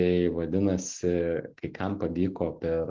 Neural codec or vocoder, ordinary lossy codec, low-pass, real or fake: none; Opus, 16 kbps; 7.2 kHz; real